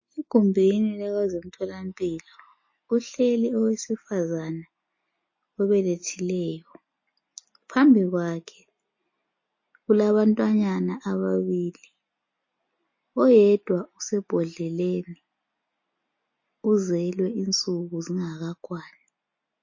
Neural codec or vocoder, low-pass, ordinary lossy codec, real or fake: none; 7.2 kHz; MP3, 32 kbps; real